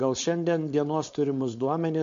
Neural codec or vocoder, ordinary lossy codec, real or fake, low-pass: codec, 16 kHz, 4.8 kbps, FACodec; MP3, 48 kbps; fake; 7.2 kHz